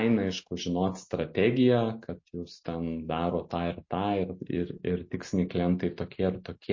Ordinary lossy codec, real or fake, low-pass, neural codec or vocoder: MP3, 32 kbps; real; 7.2 kHz; none